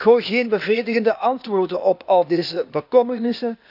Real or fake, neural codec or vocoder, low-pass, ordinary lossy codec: fake; codec, 16 kHz, 0.8 kbps, ZipCodec; 5.4 kHz; none